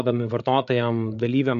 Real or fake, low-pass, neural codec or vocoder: fake; 7.2 kHz; codec, 16 kHz, 8 kbps, FreqCodec, larger model